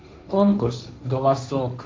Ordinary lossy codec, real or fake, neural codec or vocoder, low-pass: none; fake; codec, 16 kHz, 1.1 kbps, Voila-Tokenizer; none